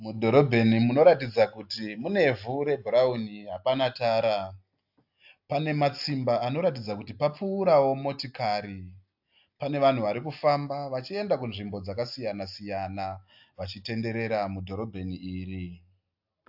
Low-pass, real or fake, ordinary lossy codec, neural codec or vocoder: 5.4 kHz; real; AAC, 48 kbps; none